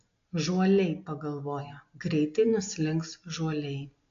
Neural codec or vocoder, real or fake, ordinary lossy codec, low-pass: none; real; AAC, 48 kbps; 7.2 kHz